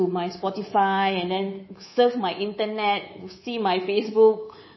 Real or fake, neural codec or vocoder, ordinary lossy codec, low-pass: fake; codec, 24 kHz, 3.1 kbps, DualCodec; MP3, 24 kbps; 7.2 kHz